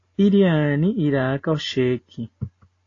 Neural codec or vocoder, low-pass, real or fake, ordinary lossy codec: none; 7.2 kHz; real; AAC, 32 kbps